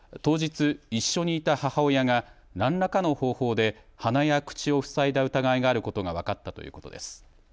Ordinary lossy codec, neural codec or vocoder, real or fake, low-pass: none; none; real; none